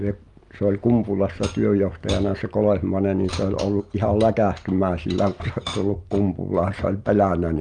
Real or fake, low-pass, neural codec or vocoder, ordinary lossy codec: real; 10.8 kHz; none; Opus, 32 kbps